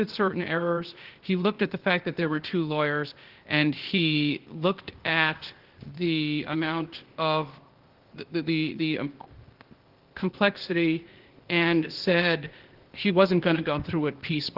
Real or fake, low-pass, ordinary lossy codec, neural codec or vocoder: fake; 5.4 kHz; Opus, 16 kbps; codec, 16 kHz, 0.8 kbps, ZipCodec